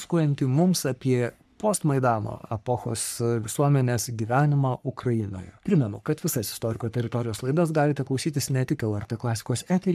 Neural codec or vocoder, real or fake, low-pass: codec, 44.1 kHz, 3.4 kbps, Pupu-Codec; fake; 14.4 kHz